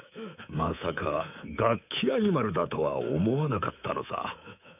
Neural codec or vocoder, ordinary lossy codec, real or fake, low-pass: codec, 24 kHz, 3.1 kbps, DualCodec; none; fake; 3.6 kHz